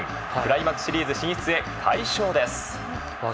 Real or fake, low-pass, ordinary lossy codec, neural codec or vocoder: real; none; none; none